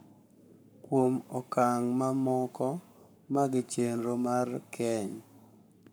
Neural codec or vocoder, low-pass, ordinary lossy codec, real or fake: codec, 44.1 kHz, 7.8 kbps, Pupu-Codec; none; none; fake